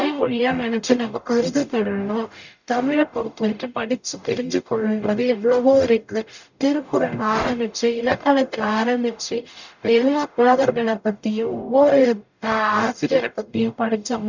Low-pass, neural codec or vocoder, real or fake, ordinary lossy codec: 7.2 kHz; codec, 44.1 kHz, 0.9 kbps, DAC; fake; none